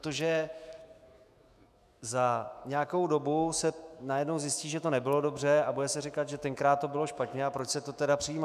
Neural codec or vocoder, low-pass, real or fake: autoencoder, 48 kHz, 128 numbers a frame, DAC-VAE, trained on Japanese speech; 14.4 kHz; fake